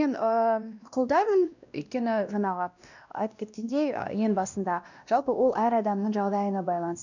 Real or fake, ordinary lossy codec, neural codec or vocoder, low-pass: fake; none; codec, 16 kHz, 1 kbps, X-Codec, WavLM features, trained on Multilingual LibriSpeech; 7.2 kHz